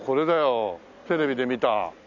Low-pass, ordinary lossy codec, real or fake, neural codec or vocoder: 7.2 kHz; none; real; none